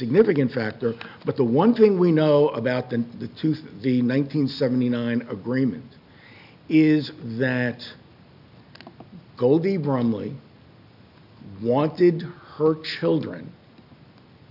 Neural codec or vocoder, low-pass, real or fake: none; 5.4 kHz; real